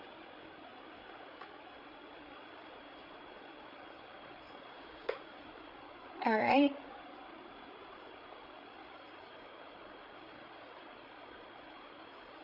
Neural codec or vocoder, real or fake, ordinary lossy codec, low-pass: codec, 16 kHz, 8 kbps, FreqCodec, larger model; fake; none; 5.4 kHz